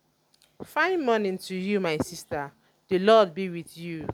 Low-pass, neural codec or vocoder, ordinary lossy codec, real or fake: 19.8 kHz; none; none; real